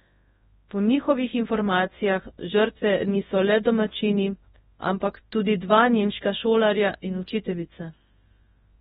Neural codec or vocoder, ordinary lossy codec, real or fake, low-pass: codec, 24 kHz, 0.9 kbps, WavTokenizer, large speech release; AAC, 16 kbps; fake; 10.8 kHz